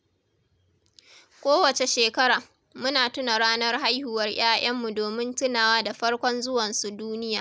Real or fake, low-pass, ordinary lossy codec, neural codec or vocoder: real; none; none; none